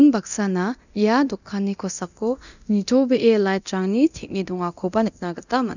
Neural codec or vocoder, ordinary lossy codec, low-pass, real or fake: codec, 24 kHz, 0.9 kbps, DualCodec; none; 7.2 kHz; fake